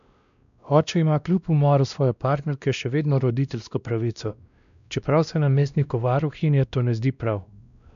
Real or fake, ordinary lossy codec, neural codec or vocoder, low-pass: fake; none; codec, 16 kHz, 1 kbps, X-Codec, WavLM features, trained on Multilingual LibriSpeech; 7.2 kHz